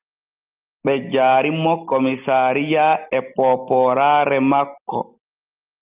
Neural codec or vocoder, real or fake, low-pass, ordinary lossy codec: none; real; 3.6 kHz; Opus, 32 kbps